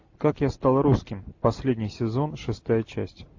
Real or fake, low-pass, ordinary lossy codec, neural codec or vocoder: real; 7.2 kHz; AAC, 48 kbps; none